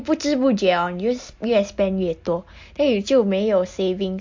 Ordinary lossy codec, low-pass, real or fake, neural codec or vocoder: MP3, 48 kbps; 7.2 kHz; real; none